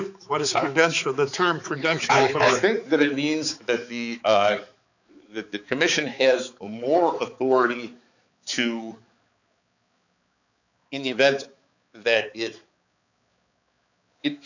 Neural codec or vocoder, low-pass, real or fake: codec, 16 kHz, 4 kbps, X-Codec, HuBERT features, trained on balanced general audio; 7.2 kHz; fake